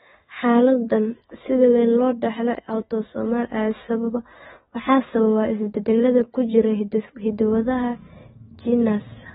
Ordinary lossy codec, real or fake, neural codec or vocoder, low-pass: AAC, 16 kbps; fake; autoencoder, 48 kHz, 128 numbers a frame, DAC-VAE, trained on Japanese speech; 19.8 kHz